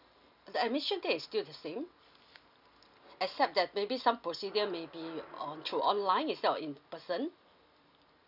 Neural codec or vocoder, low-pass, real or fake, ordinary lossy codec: none; 5.4 kHz; real; none